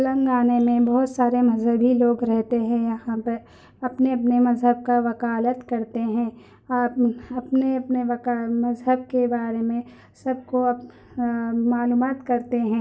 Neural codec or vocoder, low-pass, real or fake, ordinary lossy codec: none; none; real; none